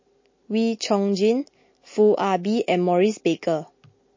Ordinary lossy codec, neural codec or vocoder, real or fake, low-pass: MP3, 32 kbps; none; real; 7.2 kHz